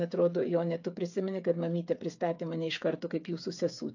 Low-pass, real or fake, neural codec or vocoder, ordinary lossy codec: 7.2 kHz; fake; codec, 16 kHz, 8 kbps, FreqCodec, smaller model; AAC, 48 kbps